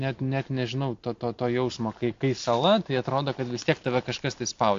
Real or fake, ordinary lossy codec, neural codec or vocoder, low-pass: real; AAC, 48 kbps; none; 7.2 kHz